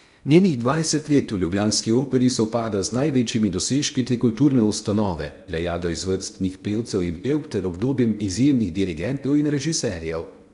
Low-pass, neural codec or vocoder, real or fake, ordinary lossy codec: 10.8 kHz; codec, 16 kHz in and 24 kHz out, 0.8 kbps, FocalCodec, streaming, 65536 codes; fake; none